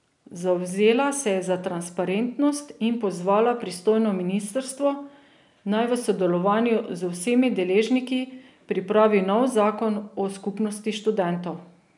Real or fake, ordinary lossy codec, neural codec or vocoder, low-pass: real; none; none; 10.8 kHz